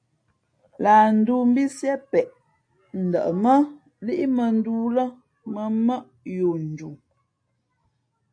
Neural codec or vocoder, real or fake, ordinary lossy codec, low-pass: none; real; AAC, 48 kbps; 9.9 kHz